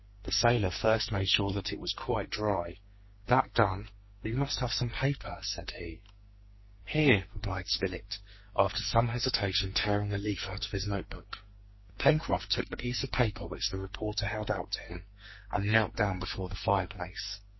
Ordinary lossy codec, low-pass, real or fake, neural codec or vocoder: MP3, 24 kbps; 7.2 kHz; fake; codec, 44.1 kHz, 2.6 kbps, SNAC